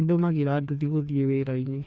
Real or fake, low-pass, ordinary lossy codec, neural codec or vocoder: fake; none; none; codec, 16 kHz, 2 kbps, FreqCodec, larger model